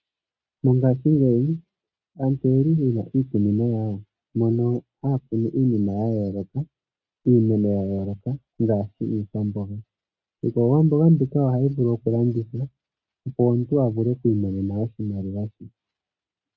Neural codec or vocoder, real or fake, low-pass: none; real; 7.2 kHz